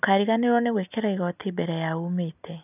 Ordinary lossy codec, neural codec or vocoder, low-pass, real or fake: none; none; 3.6 kHz; real